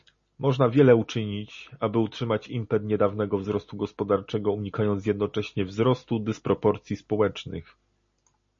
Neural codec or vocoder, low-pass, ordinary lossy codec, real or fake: none; 7.2 kHz; MP3, 32 kbps; real